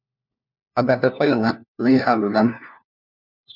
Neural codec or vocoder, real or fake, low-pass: codec, 16 kHz, 1 kbps, FunCodec, trained on LibriTTS, 50 frames a second; fake; 5.4 kHz